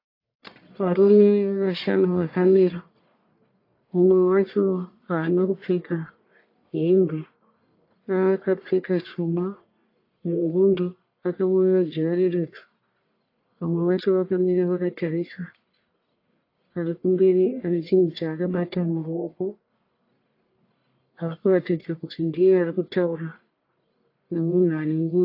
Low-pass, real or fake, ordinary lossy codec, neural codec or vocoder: 5.4 kHz; fake; AAC, 32 kbps; codec, 44.1 kHz, 1.7 kbps, Pupu-Codec